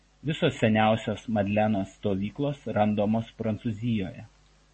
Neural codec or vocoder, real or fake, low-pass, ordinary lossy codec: none; real; 10.8 kHz; MP3, 32 kbps